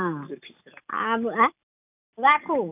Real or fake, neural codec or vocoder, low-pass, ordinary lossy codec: real; none; 3.6 kHz; none